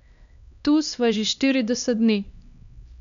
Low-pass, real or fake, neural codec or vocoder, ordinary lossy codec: 7.2 kHz; fake; codec, 16 kHz, 2 kbps, X-Codec, HuBERT features, trained on LibriSpeech; none